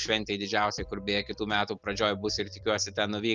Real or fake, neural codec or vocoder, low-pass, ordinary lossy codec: real; none; 7.2 kHz; Opus, 32 kbps